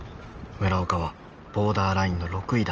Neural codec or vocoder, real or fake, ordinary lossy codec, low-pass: none; real; Opus, 24 kbps; 7.2 kHz